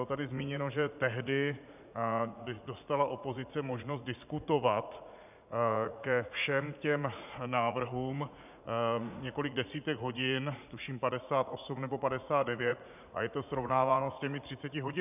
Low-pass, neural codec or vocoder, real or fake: 3.6 kHz; vocoder, 44.1 kHz, 80 mel bands, Vocos; fake